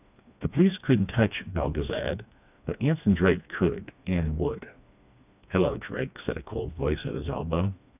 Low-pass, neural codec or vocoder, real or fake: 3.6 kHz; codec, 16 kHz, 2 kbps, FreqCodec, smaller model; fake